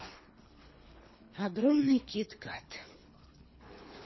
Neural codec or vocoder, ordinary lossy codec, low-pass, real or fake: codec, 24 kHz, 3 kbps, HILCodec; MP3, 24 kbps; 7.2 kHz; fake